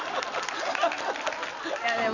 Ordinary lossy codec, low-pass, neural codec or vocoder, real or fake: none; 7.2 kHz; none; real